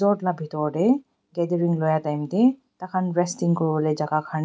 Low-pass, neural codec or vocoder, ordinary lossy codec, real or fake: none; none; none; real